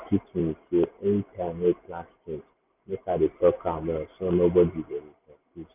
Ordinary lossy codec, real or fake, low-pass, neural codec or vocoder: none; real; 3.6 kHz; none